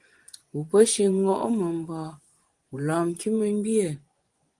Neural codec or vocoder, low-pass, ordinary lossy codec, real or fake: vocoder, 24 kHz, 100 mel bands, Vocos; 10.8 kHz; Opus, 24 kbps; fake